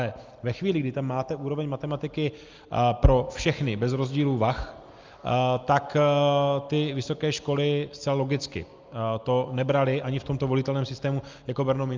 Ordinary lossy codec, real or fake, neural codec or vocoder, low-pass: Opus, 24 kbps; real; none; 7.2 kHz